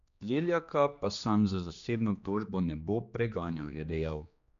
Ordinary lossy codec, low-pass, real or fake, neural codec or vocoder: none; 7.2 kHz; fake; codec, 16 kHz, 2 kbps, X-Codec, HuBERT features, trained on general audio